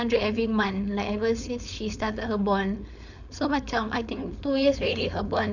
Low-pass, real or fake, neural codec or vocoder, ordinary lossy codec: 7.2 kHz; fake; codec, 16 kHz, 4.8 kbps, FACodec; none